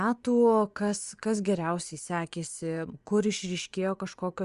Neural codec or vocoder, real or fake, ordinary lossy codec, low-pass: vocoder, 24 kHz, 100 mel bands, Vocos; fake; Opus, 64 kbps; 10.8 kHz